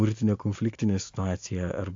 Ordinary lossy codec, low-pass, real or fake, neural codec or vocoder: AAC, 48 kbps; 7.2 kHz; real; none